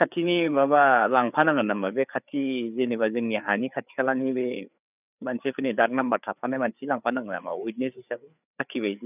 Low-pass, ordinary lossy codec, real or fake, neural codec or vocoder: 3.6 kHz; none; fake; codec, 16 kHz, 4 kbps, FreqCodec, larger model